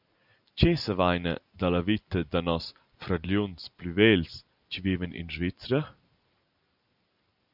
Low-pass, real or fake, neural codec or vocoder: 5.4 kHz; real; none